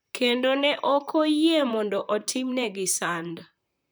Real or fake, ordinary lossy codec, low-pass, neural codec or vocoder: fake; none; none; vocoder, 44.1 kHz, 128 mel bands, Pupu-Vocoder